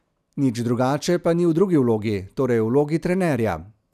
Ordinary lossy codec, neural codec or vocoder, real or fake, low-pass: none; none; real; 14.4 kHz